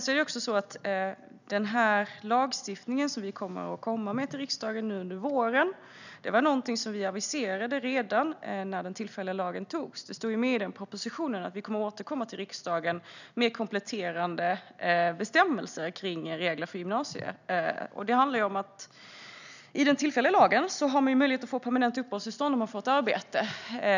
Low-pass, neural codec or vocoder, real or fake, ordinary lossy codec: 7.2 kHz; none; real; none